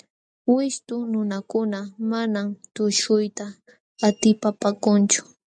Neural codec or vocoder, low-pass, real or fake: none; 9.9 kHz; real